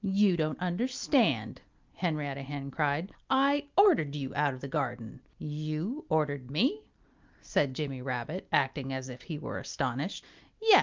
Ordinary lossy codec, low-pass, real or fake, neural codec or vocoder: Opus, 24 kbps; 7.2 kHz; real; none